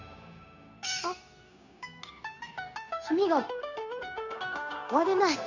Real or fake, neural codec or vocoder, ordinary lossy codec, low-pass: fake; codec, 16 kHz, 0.9 kbps, LongCat-Audio-Codec; none; 7.2 kHz